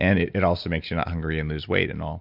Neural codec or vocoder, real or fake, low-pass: none; real; 5.4 kHz